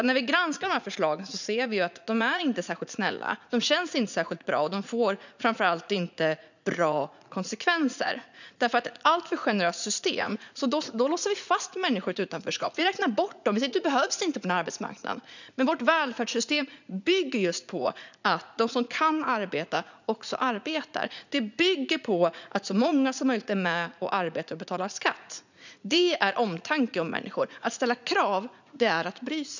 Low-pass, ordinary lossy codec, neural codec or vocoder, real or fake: 7.2 kHz; none; vocoder, 44.1 kHz, 80 mel bands, Vocos; fake